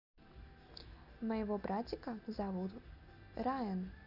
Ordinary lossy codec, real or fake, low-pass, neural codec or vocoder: none; real; 5.4 kHz; none